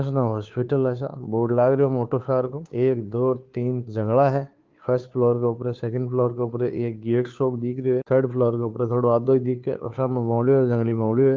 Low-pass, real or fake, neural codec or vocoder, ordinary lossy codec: 7.2 kHz; fake; codec, 16 kHz, 2 kbps, FunCodec, trained on Chinese and English, 25 frames a second; Opus, 32 kbps